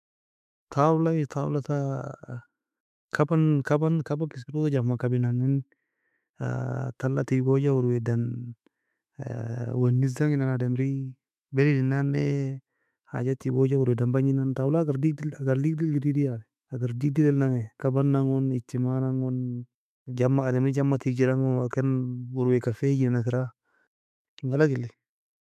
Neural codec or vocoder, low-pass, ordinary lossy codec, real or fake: autoencoder, 48 kHz, 128 numbers a frame, DAC-VAE, trained on Japanese speech; 14.4 kHz; MP3, 96 kbps; fake